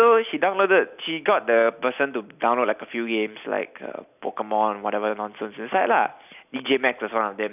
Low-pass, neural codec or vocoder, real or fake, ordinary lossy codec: 3.6 kHz; none; real; none